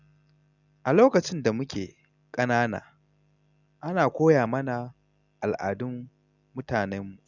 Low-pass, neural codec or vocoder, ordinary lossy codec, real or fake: 7.2 kHz; none; none; real